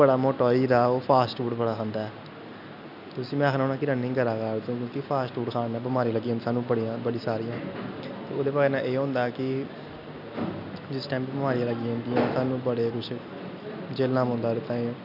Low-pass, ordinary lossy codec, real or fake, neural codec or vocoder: 5.4 kHz; none; real; none